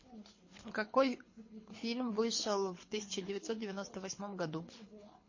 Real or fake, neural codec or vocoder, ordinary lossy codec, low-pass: fake; codec, 24 kHz, 6 kbps, HILCodec; MP3, 32 kbps; 7.2 kHz